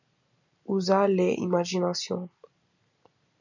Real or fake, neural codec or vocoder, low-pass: real; none; 7.2 kHz